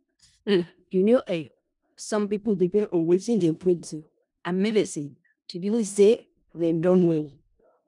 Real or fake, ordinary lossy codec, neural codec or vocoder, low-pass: fake; none; codec, 16 kHz in and 24 kHz out, 0.4 kbps, LongCat-Audio-Codec, four codebook decoder; 10.8 kHz